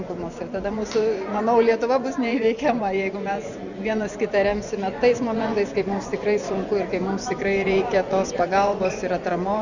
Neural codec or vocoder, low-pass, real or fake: vocoder, 24 kHz, 100 mel bands, Vocos; 7.2 kHz; fake